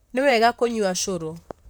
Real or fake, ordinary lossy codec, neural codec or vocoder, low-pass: fake; none; vocoder, 44.1 kHz, 128 mel bands, Pupu-Vocoder; none